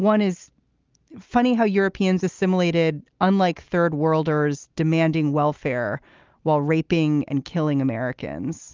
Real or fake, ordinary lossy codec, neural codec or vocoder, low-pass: real; Opus, 24 kbps; none; 7.2 kHz